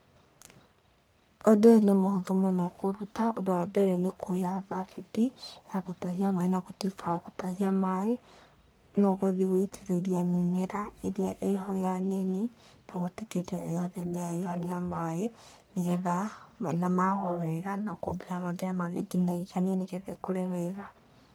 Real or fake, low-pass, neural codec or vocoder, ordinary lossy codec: fake; none; codec, 44.1 kHz, 1.7 kbps, Pupu-Codec; none